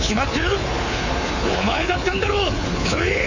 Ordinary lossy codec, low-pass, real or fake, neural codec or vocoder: Opus, 64 kbps; 7.2 kHz; fake; codec, 24 kHz, 3.1 kbps, DualCodec